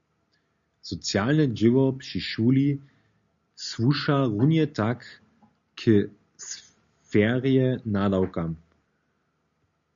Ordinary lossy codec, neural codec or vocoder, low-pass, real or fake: MP3, 64 kbps; none; 7.2 kHz; real